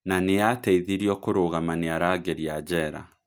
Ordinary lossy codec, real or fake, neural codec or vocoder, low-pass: none; real; none; none